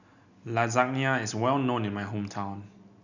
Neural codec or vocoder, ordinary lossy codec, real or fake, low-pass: none; none; real; 7.2 kHz